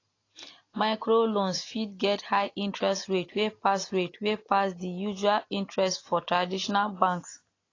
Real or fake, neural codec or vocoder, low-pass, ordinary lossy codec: real; none; 7.2 kHz; AAC, 32 kbps